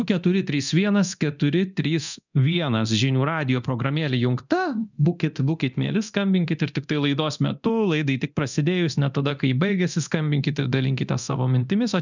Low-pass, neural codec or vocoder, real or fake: 7.2 kHz; codec, 24 kHz, 0.9 kbps, DualCodec; fake